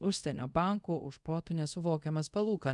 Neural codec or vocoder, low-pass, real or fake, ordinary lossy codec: codec, 24 kHz, 0.5 kbps, DualCodec; 10.8 kHz; fake; MP3, 96 kbps